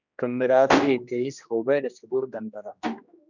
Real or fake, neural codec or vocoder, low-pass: fake; codec, 16 kHz, 1 kbps, X-Codec, HuBERT features, trained on general audio; 7.2 kHz